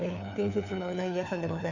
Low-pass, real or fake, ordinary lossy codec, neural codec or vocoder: 7.2 kHz; fake; none; codec, 16 kHz, 4 kbps, FunCodec, trained on LibriTTS, 50 frames a second